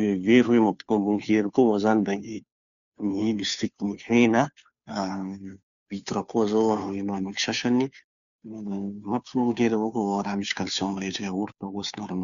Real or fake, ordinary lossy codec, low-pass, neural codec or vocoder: fake; none; 7.2 kHz; codec, 16 kHz, 2 kbps, FunCodec, trained on Chinese and English, 25 frames a second